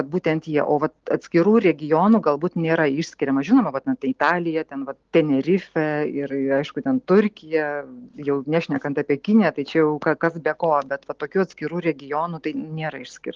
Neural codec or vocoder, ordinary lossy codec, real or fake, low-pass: none; Opus, 16 kbps; real; 7.2 kHz